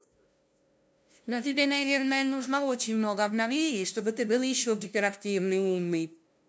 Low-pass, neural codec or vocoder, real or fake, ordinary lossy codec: none; codec, 16 kHz, 0.5 kbps, FunCodec, trained on LibriTTS, 25 frames a second; fake; none